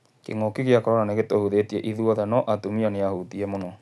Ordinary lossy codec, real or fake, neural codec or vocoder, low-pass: none; real; none; none